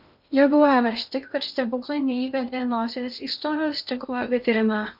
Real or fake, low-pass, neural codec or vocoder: fake; 5.4 kHz; codec, 16 kHz in and 24 kHz out, 0.8 kbps, FocalCodec, streaming, 65536 codes